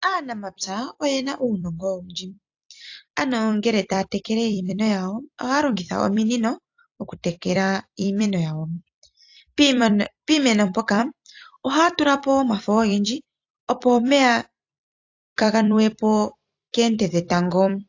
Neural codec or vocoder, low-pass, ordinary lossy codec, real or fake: vocoder, 44.1 kHz, 128 mel bands every 256 samples, BigVGAN v2; 7.2 kHz; AAC, 48 kbps; fake